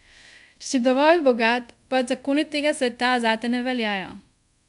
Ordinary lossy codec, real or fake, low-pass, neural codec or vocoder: none; fake; 10.8 kHz; codec, 24 kHz, 0.5 kbps, DualCodec